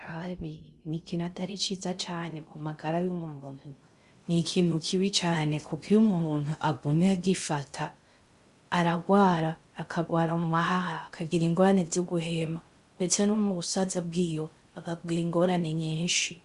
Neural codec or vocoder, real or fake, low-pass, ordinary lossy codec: codec, 16 kHz in and 24 kHz out, 0.6 kbps, FocalCodec, streaming, 2048 codes; fake; 10.8 kHz; Opus, 64 kbps